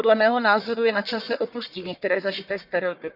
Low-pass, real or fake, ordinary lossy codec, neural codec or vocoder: 5.4 kHz; fake; none; codec, 44.1 kHz, 1.7 kbps, Pupu-Codec